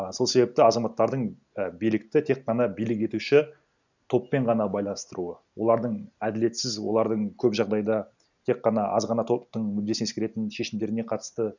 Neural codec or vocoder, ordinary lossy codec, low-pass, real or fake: none; none; 7.2 kHz; real